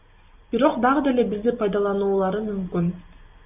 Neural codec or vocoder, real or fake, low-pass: none; real; 3.6 kHz